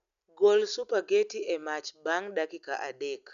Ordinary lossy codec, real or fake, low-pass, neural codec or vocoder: none; real; 7.2 kHz; none